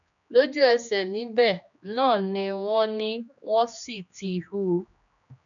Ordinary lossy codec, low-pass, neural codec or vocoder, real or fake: none; 7.2 kHz; codec, 16 kHz, 2 kbps, X-Codec, HuBERT features, trained on general audio; fake